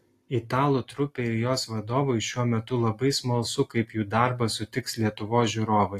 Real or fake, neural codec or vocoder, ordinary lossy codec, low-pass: real; none; AAC, 48 kbps; 14.4 kHz